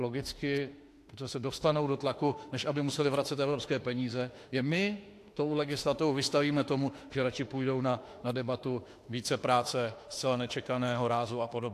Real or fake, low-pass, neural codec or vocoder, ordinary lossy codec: fake; 14.4 kHz; autoencoder, 48 kHz, 32 numbers a frame, DAC-VAE, trained on Japanese speech; AAC, 64 kbps